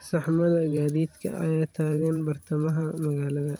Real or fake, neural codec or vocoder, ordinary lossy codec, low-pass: fake; vocoder, 44.1 kHz, 128 mel bands every 512 samples, BigVGAN v2; none; none